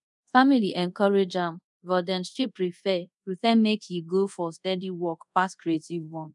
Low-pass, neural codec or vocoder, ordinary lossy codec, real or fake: 10.8 kHz; codec, 24 kHz, 0.5 kbps, DualCodec; none; fake